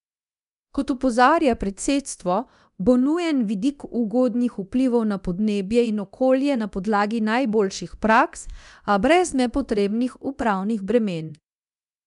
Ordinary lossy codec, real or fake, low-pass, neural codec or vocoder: none; fake; 10.8 kHz; codec, 24 kHz, 0.9 kbps, DualCodec